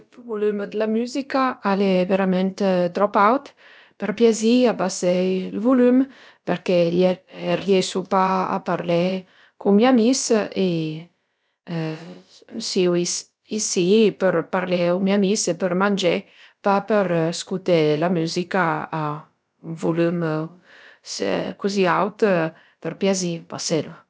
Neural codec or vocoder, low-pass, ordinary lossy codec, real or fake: codec, 16 kHz, about 1 kbps, DyCAST, with the encoder's durations; none; none; fake